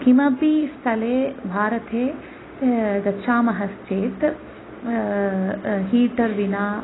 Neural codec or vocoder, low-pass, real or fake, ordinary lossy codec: none; 7.2 kHz; real; AAC, 16 kbps